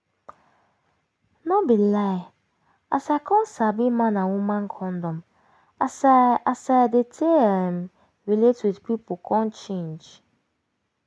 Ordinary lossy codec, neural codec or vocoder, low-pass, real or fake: none; none; none; real